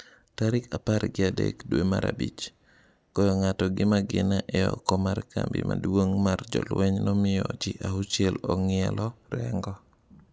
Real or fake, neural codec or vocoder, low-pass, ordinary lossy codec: real; none; none; none